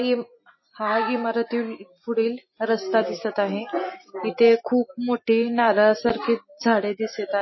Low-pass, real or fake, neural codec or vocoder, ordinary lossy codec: 7.2 kHz; real; none; MP3, 24 kbps